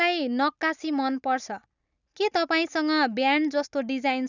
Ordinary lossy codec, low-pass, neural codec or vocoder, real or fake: none; 7.2 kHz; none; real